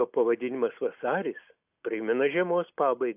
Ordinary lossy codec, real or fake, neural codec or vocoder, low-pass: AAC, 32 kbps; real; none; 3.6 kHz